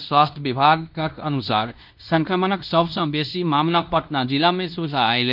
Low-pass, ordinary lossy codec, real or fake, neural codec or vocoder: 5.4 kHz; none; fake; codec, 16 kHz in and 24 kHz out, 0.9 kbps, LongCat-Audio-Codec, fine tuned four codebook decoder